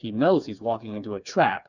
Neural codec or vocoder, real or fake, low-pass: codec, 16 kHz, 4 kbps, FreqCodec, smaller model; fake; 7.2 kHz